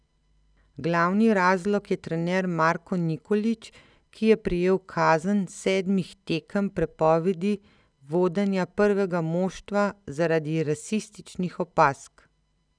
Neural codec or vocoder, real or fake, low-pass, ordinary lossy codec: none; real; 9.9 kHz; none